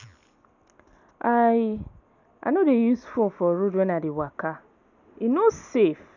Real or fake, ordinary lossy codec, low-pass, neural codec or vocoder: real; none; 7.2 kHz; none